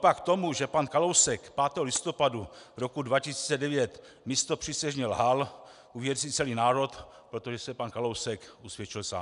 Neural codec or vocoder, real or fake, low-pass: none; real; 10.8 kHz